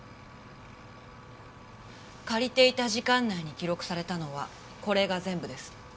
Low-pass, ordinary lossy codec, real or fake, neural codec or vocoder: none; none; real; none